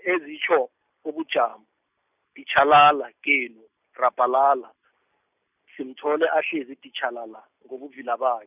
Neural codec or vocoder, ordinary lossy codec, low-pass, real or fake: none; none; 3.6 kHz; real